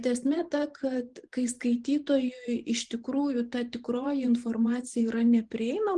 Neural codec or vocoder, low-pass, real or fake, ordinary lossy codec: vocoder, 44.1 kHz, 128 mel bands every 512 samples, BigVGAN v2; 10.8 kHz; fake; Opus, 24 kbps